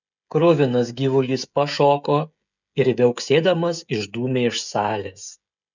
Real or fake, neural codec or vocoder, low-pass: fake; codec, 16 kHz, 16 kbps, FreqCodec, smaller model; 7.2 kHz